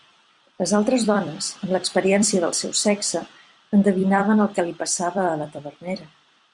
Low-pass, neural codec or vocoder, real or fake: 10.8 kHz; vocoder, 44.1 kHz, 128 mel bands every 256 samples, BigVGAN v2; fake